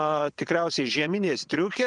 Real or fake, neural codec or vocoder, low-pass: fake; vocoder, 22.05 kHz, 80 mel bands, WaveNeXt; 9.9 kHz